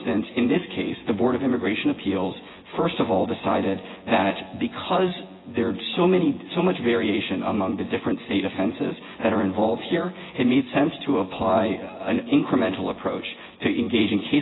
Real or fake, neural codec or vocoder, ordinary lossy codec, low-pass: fake; vocoder, 24 kHz, 100 mel bands, Vocos; AAC, 16 kbps; 7.2 kHz